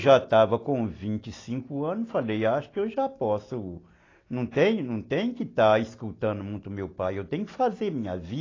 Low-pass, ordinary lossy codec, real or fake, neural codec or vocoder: 7.2 kHz; AAC, 32 kbps; real; none